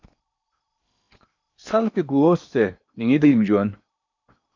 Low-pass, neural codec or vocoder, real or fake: 7.2 kHz; codec, 16 kHz in and 24 kHz out, 0.8 kbps, FocalCodec, streaming, 65536 codes; fake